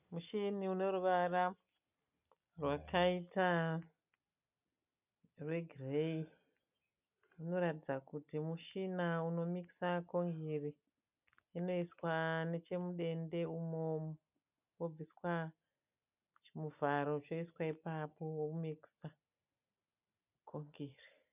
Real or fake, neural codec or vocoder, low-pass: real; none; 3.6 kHz